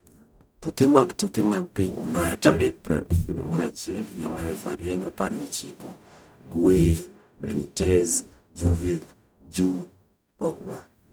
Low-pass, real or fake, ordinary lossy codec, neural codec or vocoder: none; fake; none; codec, 44.1 kHz, 0.9 kbps, DAC